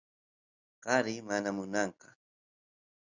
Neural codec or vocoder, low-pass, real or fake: none; 7.2 kHz; real